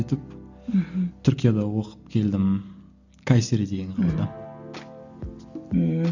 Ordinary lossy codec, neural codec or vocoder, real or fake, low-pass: none; none; real; 7.2 kHz